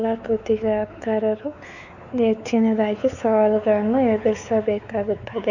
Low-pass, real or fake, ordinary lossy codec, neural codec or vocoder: 7.2 kHz; fake; none; codec, 16 kHz, 4 kbps, X-Codec, WavLM features, trained on Multilingual LibriSpeech